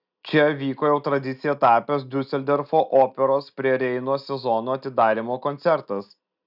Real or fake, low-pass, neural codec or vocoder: real; 5.4 kHz; none